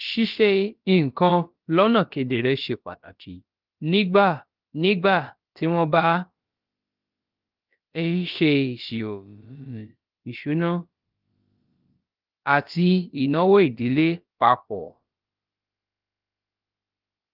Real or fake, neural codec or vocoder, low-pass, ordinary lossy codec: fake; codec, 16 kHz, about 1 kbps, DyCAST, with the encoder's durations; 5.4 kHz; Opus, 32 kbps